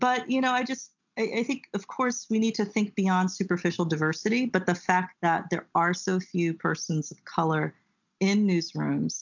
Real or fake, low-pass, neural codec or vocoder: real; 7.2 kHz; none